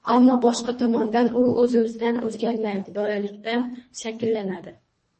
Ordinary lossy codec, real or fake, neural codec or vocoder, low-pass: MP3, 32 kbps; fake; codec, 24 kHz, 1.5 kbps, HILCodec; 10.8 kHz